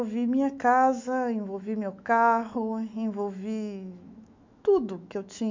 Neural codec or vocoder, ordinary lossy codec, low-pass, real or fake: autoencoder, 48 kHz, 128 numbers a frame, DAC-VAE, trained on Japanese speech; MP3, 64 kbps; 7.2 kHz; fake